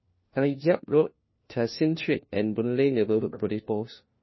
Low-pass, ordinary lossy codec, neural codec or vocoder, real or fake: 7.2 kHz; MP3, 24 kbps; codec, 16 kHz, 1 kbps, FunCodec, trained on LibriTTS, 50 frames a second; fake